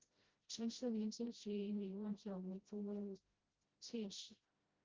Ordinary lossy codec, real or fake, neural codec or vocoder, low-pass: Opus, 32 kbps; fake; codec, 16 kHz, 0.5 kbps, FreqCodec, smaller model; 7.2 kHz